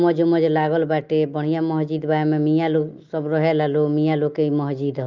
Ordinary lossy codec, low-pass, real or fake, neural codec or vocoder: Opus, 24 kbps; 7.2 kHz; real; none